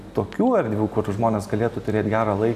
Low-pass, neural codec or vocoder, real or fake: 14.4 kHz; none; real